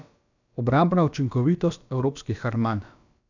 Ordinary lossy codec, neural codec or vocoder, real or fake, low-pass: none; codec, 16 kHz, about 1 kbps, DyCAST, with the encoder's durations; fake; 7.2 kHz